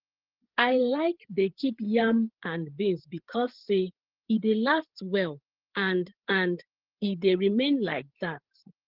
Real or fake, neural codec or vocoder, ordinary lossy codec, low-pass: fake; codec, 16 kHz, 8 kbps, FreqCodec, larger model; Opus, 16 kbps; 5.4 kHz